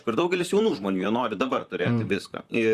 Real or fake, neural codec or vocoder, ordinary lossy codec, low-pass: fake; vocoder, 44.1 kHz, 128 mel bands, Pupu-Vocoder; Opus, 64 kbps; 14.4 kHz